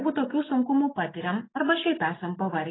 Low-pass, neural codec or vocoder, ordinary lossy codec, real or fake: 7.2 kHz; none; AAC, 16 kbps; real